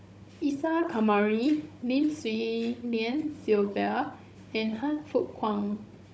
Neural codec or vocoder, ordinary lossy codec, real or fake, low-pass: codec, 16 kHz, 16 kbps, FunCodec, trained on Chinese and English, 50 frames a second; none; fake; none